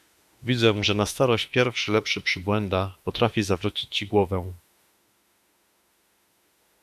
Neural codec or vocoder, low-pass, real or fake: autoencoder, 48 kHz, 32 numbers a frame, DAC-VAE, trained on Japanese speech; 14.4 kHz; fake